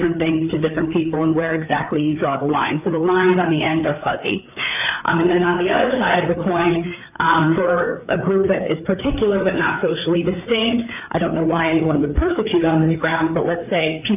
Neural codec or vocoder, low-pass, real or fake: codec, 16 kHz, 4 kbps, FreqCodec, larger model; 3.6 kHz; fake